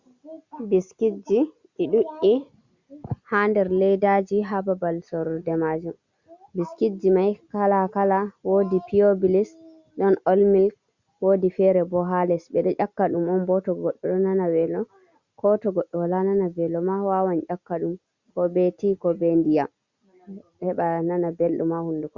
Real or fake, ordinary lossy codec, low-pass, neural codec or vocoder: real; Opus, 64 kbps; 7.2 kHz; none